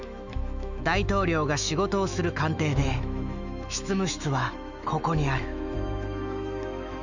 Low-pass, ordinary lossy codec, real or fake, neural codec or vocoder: 7.2 kHz; none; fake; autoencoder, 48 kHz, 128 numbers a frame, DAC-VAE, trained on Japanese speech